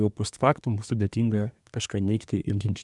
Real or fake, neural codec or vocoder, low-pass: fake; codec, 24 kHz, 1 kbps, SNAC; 10.8 kHz